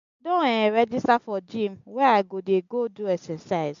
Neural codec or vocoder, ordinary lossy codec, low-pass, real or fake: none; MP3, 64 kbps; 7.2 kHz; real